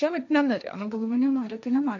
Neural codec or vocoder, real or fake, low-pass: codec, 16 kHz, 1.1 kbps, Voila-Tokenizer; fake; 7.2 kHz